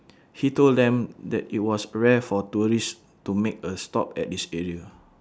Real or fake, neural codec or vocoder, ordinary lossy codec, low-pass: real; none; none; none